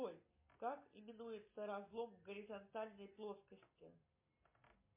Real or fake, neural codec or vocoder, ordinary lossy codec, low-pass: real; none; MP3, 24 kbps; 3.6 kHz